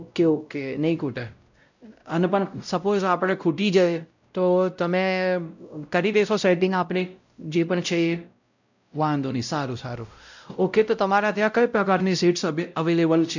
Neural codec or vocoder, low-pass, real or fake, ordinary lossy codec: codec, 16 kHz, 0.5 kbps, X-Codec, WavLM features, trained on Multilingual LibriSpeech; 7.2 kHz; fake; none